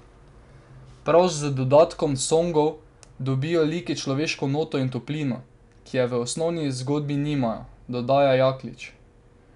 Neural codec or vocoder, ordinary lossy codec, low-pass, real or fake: none; none; 10.8 kHz; real